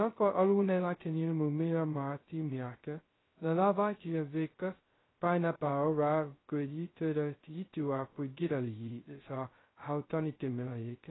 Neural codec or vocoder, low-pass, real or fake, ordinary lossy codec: codec, 16 kHz, 0.2 kbps, FocalCodec; 7.2 kHz; fake; AAC, 16 kbps